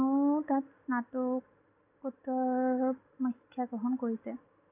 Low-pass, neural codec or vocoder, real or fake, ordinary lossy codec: 3.6 kHz; none; real; none